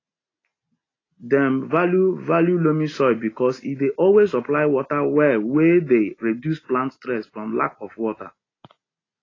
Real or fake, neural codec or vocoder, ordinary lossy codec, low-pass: real; none; AAC, 32 kbps; 7.2 kHz